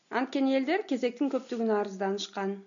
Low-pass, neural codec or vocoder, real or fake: 7.2 kHz; none; real